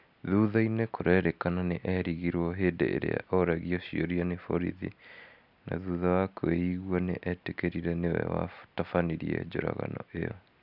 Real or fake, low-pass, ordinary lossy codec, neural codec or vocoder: real; 5.4 kHz; none; none